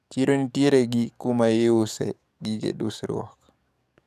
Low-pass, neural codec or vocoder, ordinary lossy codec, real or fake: 14.4 kHz; codec, 44.1 kHz, 7.8 kbps, DAC; none; fake